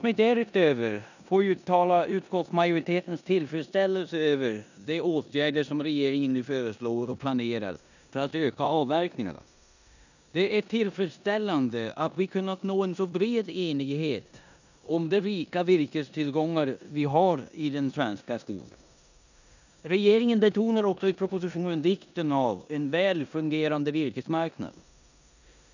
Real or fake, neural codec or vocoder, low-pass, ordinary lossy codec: fake; codec, 16 kHz in and 24 kHz out, 0.9 kbps, LongCat-Audio-Codec, four codebook decoder; 7.2 kHz; none